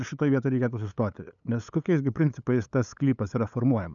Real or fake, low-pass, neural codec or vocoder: fake; 7.2 kHz; codec, 16 kHz, 8 kbps, FunCodec, trained on LibriTTS, 25 frames a second